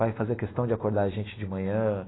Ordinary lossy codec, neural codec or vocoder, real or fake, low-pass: AAC, 16 kbps; none; real; 7.2 kHz